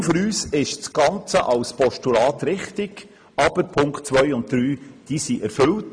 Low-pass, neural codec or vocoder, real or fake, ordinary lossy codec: 9.9 kHz; none; real; MP3, 64 kbps